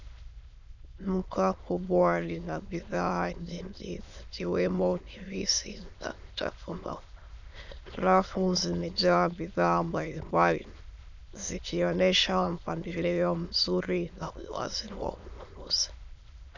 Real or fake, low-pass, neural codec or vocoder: fake; 7.2 kHz; autoencoder, 22.05 kHz, a latent of 192 numbers a frame, VITS, trained on many speakers